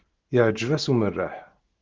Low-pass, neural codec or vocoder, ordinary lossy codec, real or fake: 7.2 kHz; none; Opus, 16 kbps; real